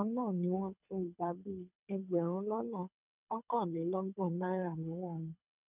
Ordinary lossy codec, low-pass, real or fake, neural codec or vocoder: none; 3.6 kHz; fake; codec, 24 kHz, 3 kbps, HILCodec